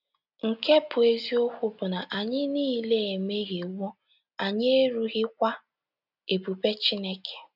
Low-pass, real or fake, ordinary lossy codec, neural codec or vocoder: 5.4 kHz; real; none; none